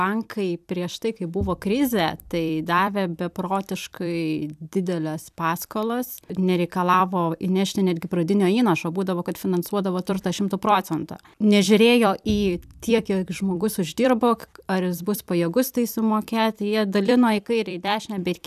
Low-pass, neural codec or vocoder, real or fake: 14.4 kHz; vocoder, 44.1 kHz, 128 mel bands every 256 samples, BigVGAN v2; fake